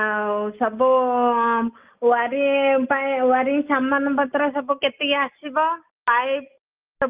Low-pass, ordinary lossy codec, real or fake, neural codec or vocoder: 3.6 kHz; Opus, 24 kbps; real; none